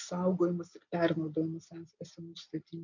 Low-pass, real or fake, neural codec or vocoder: 7.2 kHz; real; none